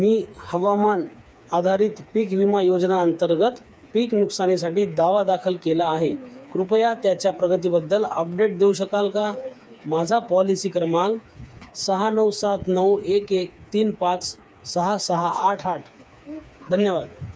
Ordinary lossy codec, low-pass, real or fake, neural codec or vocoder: none; none; fake; codec, 16 kHz, 4 kbps, FreqCodec, smaller model